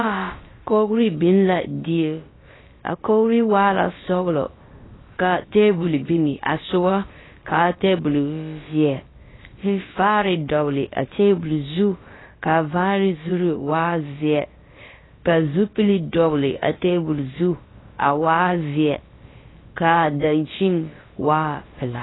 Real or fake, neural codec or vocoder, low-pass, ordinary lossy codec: fake; codec, 16 kHz, about 1 kbps, DyCAST, with the encoder's durations; 7.2 kHz; AAC, 16 kbps